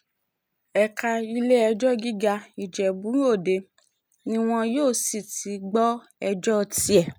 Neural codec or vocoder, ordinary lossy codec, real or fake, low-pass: none; none; real; none